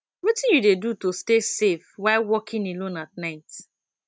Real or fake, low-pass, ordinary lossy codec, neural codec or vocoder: real; none; none; none